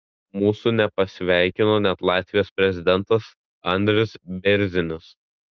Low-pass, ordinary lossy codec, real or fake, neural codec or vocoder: 7.2 kHz; Opus, 32 kbps; real; none